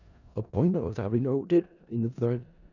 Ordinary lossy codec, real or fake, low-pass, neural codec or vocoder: none; fake; 7.2 kHz; codec, 16 kHz in and 24 kHz out, 0.4 kbps, LongCat-Audio-Codec, four codebook decoder